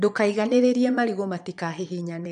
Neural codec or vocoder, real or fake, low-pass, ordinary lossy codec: vocoder, 22.05 kHz, 80 mel bands, Vocos; fake; 9.9 kHz; none